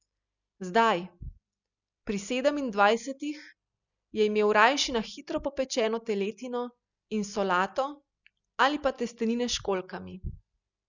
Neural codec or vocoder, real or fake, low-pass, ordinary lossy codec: none; real; 7.2 kHz; none